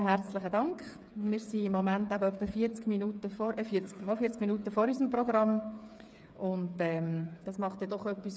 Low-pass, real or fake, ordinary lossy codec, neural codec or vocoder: none; fake; none; codec, 16 kHz, 8 kbps, FreqCodec, smaller model